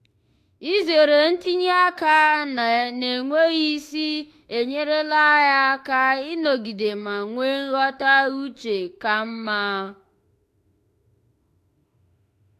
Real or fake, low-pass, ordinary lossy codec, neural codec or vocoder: fake; 14.4 kHz; AAC, 64 kbps; autoencoder, 48 kHz, 32 numbers a frame, DAC-VAE, trained on Japanese speech